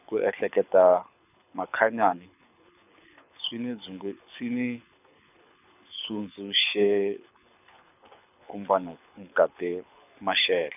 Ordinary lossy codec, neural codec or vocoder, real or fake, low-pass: none; codec, 24 kHz, 6 kbps, HILCodec; fake; 3.6 kHz